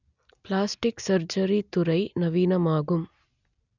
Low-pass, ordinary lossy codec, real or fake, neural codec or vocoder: 7.2 kHz; none; real; none